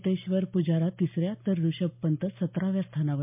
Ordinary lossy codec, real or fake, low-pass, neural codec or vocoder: MP3, 32 kbps; real; 3.6 kHz; none